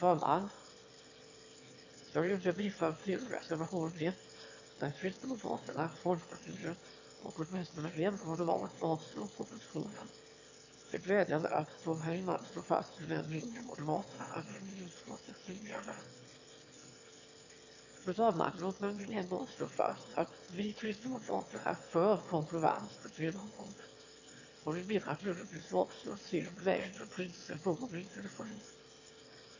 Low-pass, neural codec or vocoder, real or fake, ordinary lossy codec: 7.2 kHz; autoencoder, 22.05 kHz, a latent of 192 numbers a frame, VITS, trained on one speaker; fake; AAC, 48 kbps